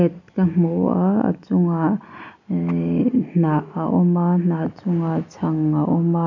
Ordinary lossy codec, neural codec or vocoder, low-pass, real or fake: none; none; 7.2 kHz; real